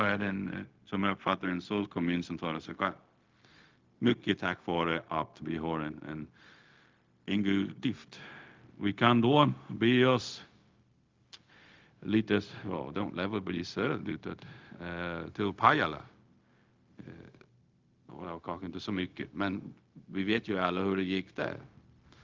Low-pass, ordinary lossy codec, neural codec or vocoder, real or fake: 7.2 kHz; Opus, 32 kbps; codec, 16 kHz, 0.4 kbps, LongCat-Audio-Codec; fake